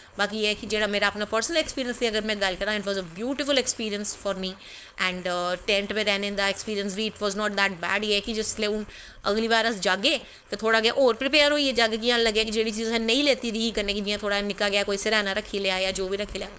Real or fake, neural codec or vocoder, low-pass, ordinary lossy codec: fake; codec, 16 kHz, 4.8 kbps, FACodec; none; none